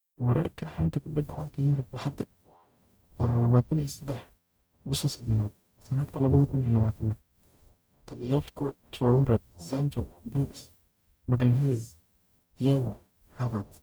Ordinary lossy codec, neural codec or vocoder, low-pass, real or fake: none; codec, 44.1 kHz, 0.9 kbps, DAC; none; fake